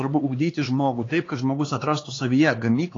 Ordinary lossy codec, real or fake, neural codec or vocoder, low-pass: AAC, 32 kbps; fake; codec, 16 kHz, 4 kbps, X-Codec, HuBERT features, trained on LibriSpeech; 7.2 kHz